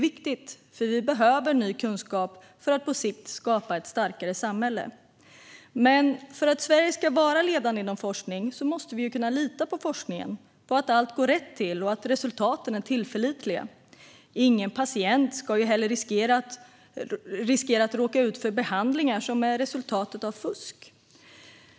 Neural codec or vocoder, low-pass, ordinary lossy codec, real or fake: none; none; none; real